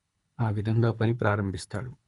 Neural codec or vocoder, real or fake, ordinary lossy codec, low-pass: codec, 24 kHz, 3 kbps, HILCodec; fake; none; 10.8 kHz